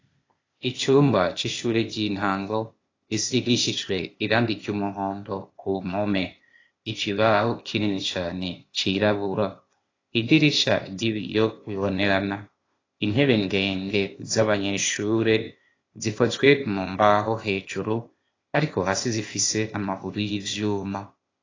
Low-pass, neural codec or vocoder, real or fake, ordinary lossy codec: 7.2 kHz; codec, 16 kHz, 0.8 kbps, ZipCodec; fake; AAC, 32 kbps